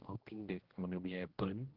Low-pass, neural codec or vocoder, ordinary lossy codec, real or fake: 5.4 kHz; codec, 16 kHz, 1 kbps, X-Codec, HuBERT features, trained on general audio; Opus, 16 kbps; fake